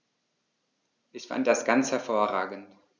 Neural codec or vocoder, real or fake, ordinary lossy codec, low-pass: none; real; none; none